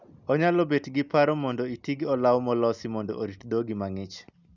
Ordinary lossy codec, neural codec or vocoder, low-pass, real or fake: none; none; 7.2 kHz; real